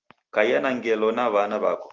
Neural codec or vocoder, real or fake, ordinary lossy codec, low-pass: none; real; Opus, 32 kbps; 7.2 kHz